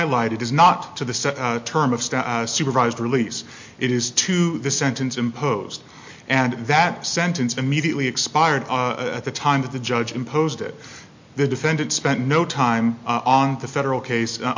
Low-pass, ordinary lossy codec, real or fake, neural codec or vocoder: 7.2 kHz; MP3, 48 kbps; real; none